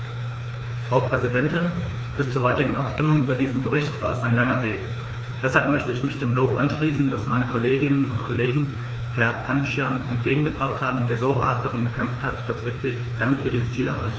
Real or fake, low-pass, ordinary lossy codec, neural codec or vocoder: fake; none; none; codec, 16 kHz, 2 kbps, FreqCodec, larger model